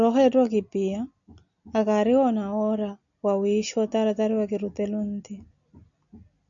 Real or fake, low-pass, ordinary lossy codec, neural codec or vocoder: real; 7.2 kHz; MP3, 96 kbps; none